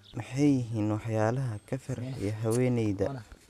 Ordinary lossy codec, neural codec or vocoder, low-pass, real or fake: none; none; 14.4 kHz; real